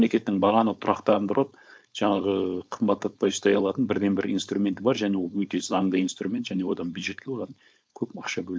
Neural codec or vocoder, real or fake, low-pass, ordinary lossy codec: codec, 16 kHz, 4.8 kbps, FACodec; fake; none; none